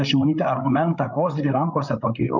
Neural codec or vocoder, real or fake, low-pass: codec, 16 kHz, 8 kbps, FreqCodec, larger model; fake; 7.2 kHz